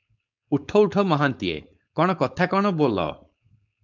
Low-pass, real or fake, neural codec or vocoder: 7.2 kHz; fake; codec, 16 kHz, 4.8 kbps, FACodec